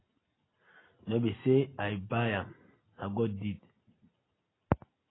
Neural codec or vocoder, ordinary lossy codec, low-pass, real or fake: none; AAC, 16 kbps; 7.2 kHz; real